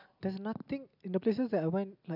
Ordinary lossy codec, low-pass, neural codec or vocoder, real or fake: none; 5.4 kHz; none; real